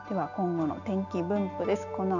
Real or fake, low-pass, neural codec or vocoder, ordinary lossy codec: real; 7.2 kHz; none; none